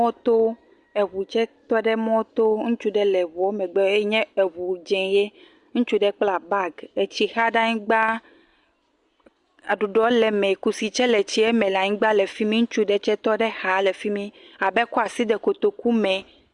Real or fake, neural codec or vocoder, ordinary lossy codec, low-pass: real; none; Opus, 64 kbps; 10.8 kHz